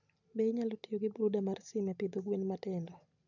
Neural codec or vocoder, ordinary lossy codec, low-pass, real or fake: none; none; 7.2 kHz; real